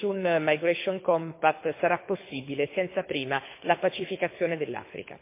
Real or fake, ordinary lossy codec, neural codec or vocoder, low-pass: fake; MP3, 24 kbps; codec, 24 kHz, 6 kbps, HILCodec; 3.6 kHz